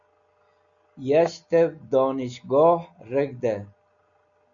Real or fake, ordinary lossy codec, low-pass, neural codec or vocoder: real; MP3, 96 kbps; 7.2 kHz; none